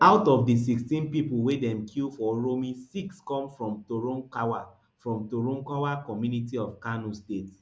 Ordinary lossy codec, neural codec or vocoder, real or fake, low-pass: none; none; real; none